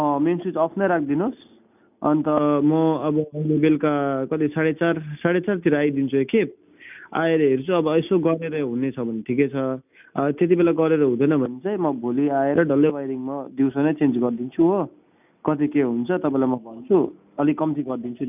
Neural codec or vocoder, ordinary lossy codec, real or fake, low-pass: none; none; real; 3.6 kHz